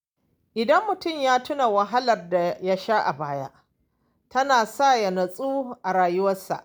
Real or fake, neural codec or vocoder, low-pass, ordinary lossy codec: real; none; none; none